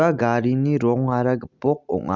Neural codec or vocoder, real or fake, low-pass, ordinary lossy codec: none; real; 7.2 kHz; none